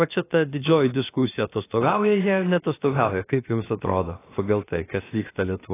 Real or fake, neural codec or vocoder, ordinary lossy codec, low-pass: fake; codec, 16 kHz, about 1 kbps, DyCAST, with the encoder's durations; AAC, 16 kbps; 3.6 kHz